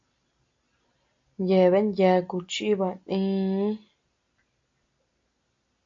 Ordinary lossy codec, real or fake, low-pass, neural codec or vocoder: AAC, 48 kbps; real; 7.2 kHz; none